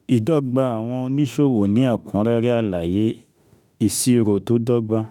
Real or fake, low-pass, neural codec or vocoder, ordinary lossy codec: fake; 19.8 kHz; autoencoder, 48 kHz, 32 numbers a frame, DAC-VAE, trained on Japanese speech; none